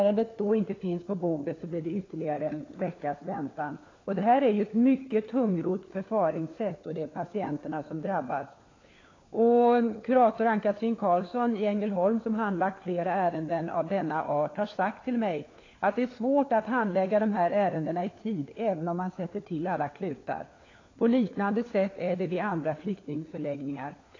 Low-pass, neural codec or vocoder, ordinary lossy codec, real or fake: 7.2 kHz; codec, 16 kHz, 4 kbps, FunCodec, trained on LibriTTS, 50 frames a second; AAC, 32 kbps; fake